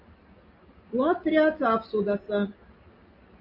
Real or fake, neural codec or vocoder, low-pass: real; none; 5.4 kHz